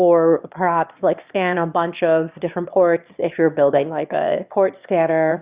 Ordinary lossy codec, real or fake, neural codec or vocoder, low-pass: Opus, 64 kbps; fake; autoencoder, 22.05 kHz, a latent of 192 numbers a frame, VITS, trained on one speaker; 3.6 kHz